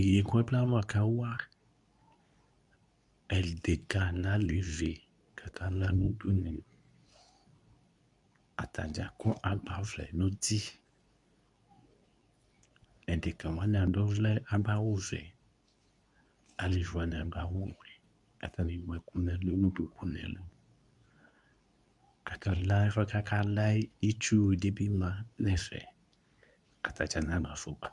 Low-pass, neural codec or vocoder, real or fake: 10.8 kHz; codec, 24 kHz, 0.9 kbps, WavTokenizer, medium speech release version 2; fake